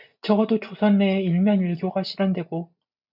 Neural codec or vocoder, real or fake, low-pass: none; real; 5.4 kHz